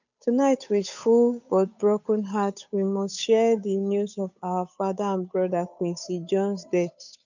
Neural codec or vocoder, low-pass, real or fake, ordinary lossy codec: codec, 16 kHz, 8 kbps, FunCodec, trained on Chinese and English, 25 frames a second; 7.2 kHz; fake; none